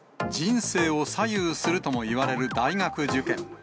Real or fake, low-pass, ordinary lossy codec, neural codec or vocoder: real; none; none; none